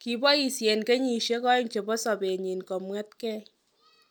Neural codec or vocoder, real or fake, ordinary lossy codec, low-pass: none; real; none; none